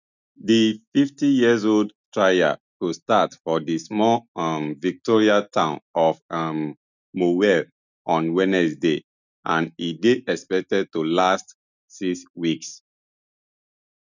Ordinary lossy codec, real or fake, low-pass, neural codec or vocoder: none; real; 7.2 kHz; none